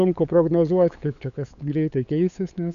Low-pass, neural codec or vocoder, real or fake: 7.2 kHz; codec, 16 kHz, 8 kbps, FunCodec, trained on LibriTTS, 25 frames a second; fake